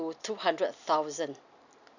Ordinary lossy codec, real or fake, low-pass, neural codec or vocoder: none; real; 7.2 kHz; none